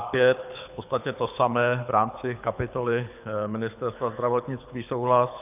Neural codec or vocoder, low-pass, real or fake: codec, 24 kHz, 6 kbps, HILCodec; 3.6 kHz; fake